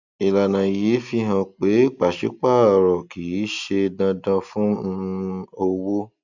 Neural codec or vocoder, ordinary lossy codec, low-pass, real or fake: none; none; 7.2 kHz; real